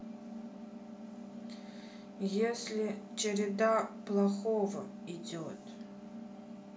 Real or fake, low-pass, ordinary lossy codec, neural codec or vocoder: real; none; none; none